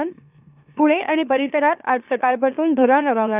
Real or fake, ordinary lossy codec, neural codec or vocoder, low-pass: fake; none; autoencoder, 44.1 kHz, a latent of 192 numbers a frame, MeloTTS; 3.6 kHz